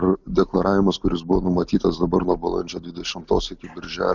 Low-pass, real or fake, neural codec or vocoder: 7.2 kHz; real; none